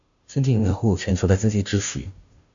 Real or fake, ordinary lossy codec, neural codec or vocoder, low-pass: fake; AAC, 64 kbps; codec, 16 kHz, 0.5 kbps, FunCodec, trained on Chinese and English, 25 frames a second; 7.2 kHz